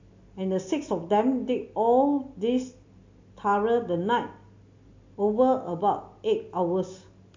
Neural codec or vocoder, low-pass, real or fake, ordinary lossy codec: none; 7.2 kHz; real; MP3, 48 kbps